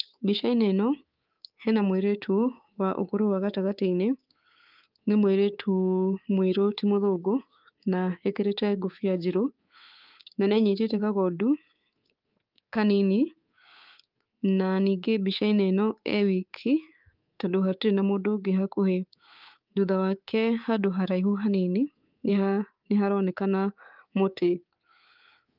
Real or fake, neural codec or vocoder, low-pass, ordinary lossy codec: fake; codec, 24 kHz, 3.1 kbps, DualCodec; 5.4 kHz; Opus, 32 kbps